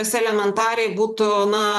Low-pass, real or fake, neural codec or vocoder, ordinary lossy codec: 14.4 kHz; fake; vocoder, 44.1 kHz, 128 mel bands, Pupu-Vocoder; MP3, 96 kbps